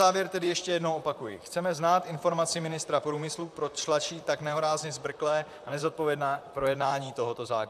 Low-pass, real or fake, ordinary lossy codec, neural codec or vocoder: 14.4 kHz; fake; AAC, 96 kbps; vocoder, 44.1 kHz, 128 mel bands, Pupu-Vocoder